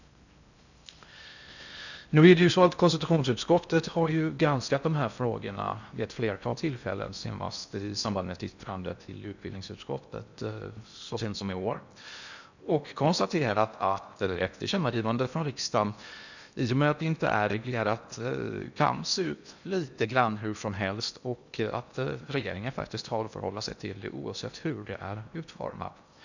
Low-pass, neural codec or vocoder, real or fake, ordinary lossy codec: 7.2 kHz; codec, 16 kHz in and 24 kHz out, 0.6 kbps, FocalCodec, streaming, 4096 codes; fake; none